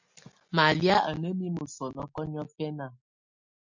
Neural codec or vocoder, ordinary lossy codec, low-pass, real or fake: none; MP3, 48 kbps; 7.2 kHz; real